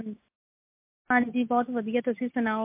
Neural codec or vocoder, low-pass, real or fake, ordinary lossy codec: none; 3.6 kHz; real; MP3, 32 kbps